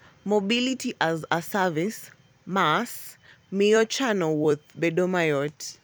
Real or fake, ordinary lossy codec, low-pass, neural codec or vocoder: fake; none; none; vocoder, 44.1 kHz, 128 mel bands every 256 samples, BigVGAN v2